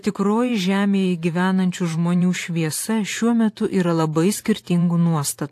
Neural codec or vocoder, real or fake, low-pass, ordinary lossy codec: vocoder, 44.1 kHz, 128 mel bands every 512 samples, BigVGAN v2; fake; 14.4 kHz; AAC, 64 kbps